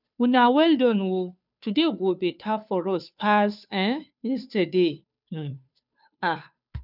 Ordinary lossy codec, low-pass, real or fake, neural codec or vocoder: none; 5.4 kHz; fake; codec, 16 kHz, 2 kbps, FunCodec, trained on Chinese and English, 25 frames a second